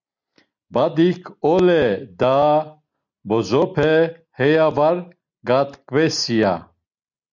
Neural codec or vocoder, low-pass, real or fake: none; 7.2 kHz; real